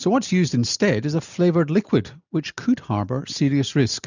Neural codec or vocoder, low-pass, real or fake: none; 7.2 kHz; real